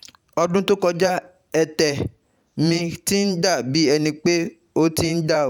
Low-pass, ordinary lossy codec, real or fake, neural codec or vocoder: 19.8 kHz; none; fake; vocoder, 44.1 kHz, 128 mel bands every 512 samples, BigVGAN v2